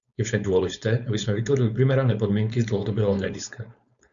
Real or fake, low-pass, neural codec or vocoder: fake; 7.2 kHz; codec, 16 kHz, 4.8 kbps, FACodec